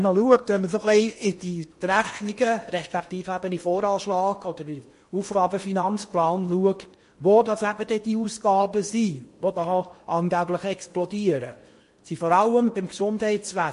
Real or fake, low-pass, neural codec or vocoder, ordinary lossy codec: fake; 10.8 kHz; codec, 16 kHz in and 24 kHz out, 0.8 kbps, FocalCodec, streaming, 65536 codes; MP3, 48 kbps